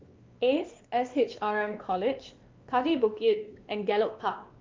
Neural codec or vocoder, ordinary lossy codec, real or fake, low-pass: codec, 16 kHz, 2 kbps, X-Codec, WavLM features, trained on Multilingual LibriSpeech; Opus, 32 kbps; fake; 7.2 kHz